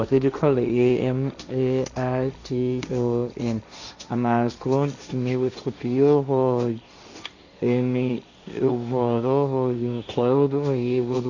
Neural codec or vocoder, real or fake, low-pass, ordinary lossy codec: codec, 16 kHz, 1.1 kbps, Voila-Tokenizer; fake; 7.2 kHz; none